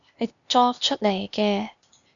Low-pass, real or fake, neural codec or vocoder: 7.2 kHz; fake; codec, 16 kHz, 0.8 kbps, ZipCodec